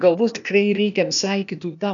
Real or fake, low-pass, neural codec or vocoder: fake; 7.2 kHz; codec, 16 kHz, 0.8 kbps, ZipCodec